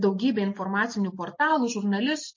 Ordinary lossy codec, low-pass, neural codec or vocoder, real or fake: MP3, 32 kbps; 7.2 kHz; none; real